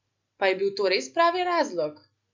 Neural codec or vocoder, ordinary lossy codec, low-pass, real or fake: none; MP3, 64 kbps; 7.2 kHz; real